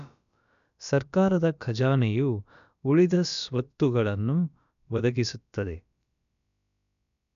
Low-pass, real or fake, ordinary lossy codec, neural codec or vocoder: 7.2 kHz; fake; none; codec, 16 kHz, about 1 kbps, DyCAST, with the encoder's durations